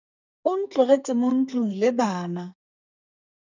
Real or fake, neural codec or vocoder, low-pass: fake; codec, 44.1 kHz, 2.6 kbps, SNAC; 7.2 kHz